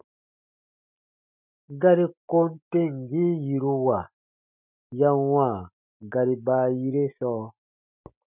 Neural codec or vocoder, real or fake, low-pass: none; real; 3.6 kHz